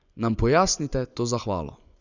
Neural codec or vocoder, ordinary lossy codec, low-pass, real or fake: vocoder, 24 kHz, 100 mel bands, Vocos; none; 7.2 kHz; fake